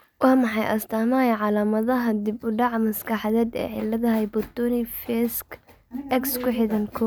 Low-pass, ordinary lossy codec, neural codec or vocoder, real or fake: none; none; none; real